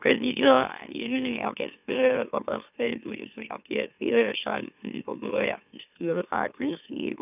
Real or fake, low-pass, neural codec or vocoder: fake; 3.6 kHz; autoencoder, 44.1 kHz, a latent of 192 numbers a frame, MeloTTS